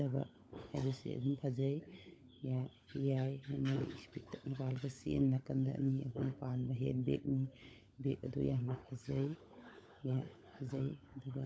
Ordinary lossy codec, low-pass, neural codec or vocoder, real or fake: none; none; codec, 16 kHz, 16 kbps, FunCodec, trained on LibriTTS, 50 frames a second; fake